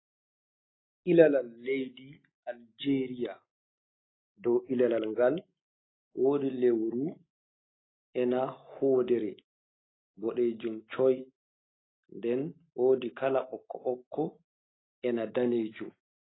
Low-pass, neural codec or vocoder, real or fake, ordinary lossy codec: 7.2 kHz; none; real; AAC, 16 kbps